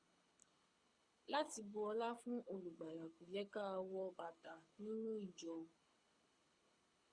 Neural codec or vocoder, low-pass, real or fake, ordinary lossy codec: codec, 24 kHz, 6 kbps, HILCodec; 9.9 kHz; fake; Opus, 64 kbps